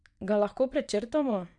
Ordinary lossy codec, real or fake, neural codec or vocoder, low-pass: none; fake; vocoder, 22.05 kHz, 80 mel bands, WaveNeXt; 9.9 kHz